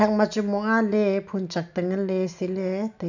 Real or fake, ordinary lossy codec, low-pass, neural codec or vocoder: real; none; 7.2 kHz; none